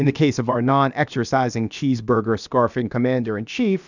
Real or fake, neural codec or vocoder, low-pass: fake; codec, 16 kHz, about 1 kbps, DyCAST, with the encoder's durations; 7.2 kHz